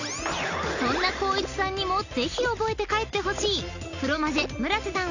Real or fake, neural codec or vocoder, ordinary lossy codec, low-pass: real; none; none; 7.2 kHz